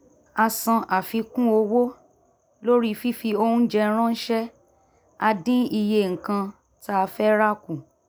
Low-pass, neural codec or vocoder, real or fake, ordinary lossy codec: none; none; real; none